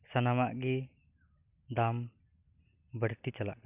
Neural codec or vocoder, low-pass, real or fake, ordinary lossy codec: none; 3.6 kHz; real; none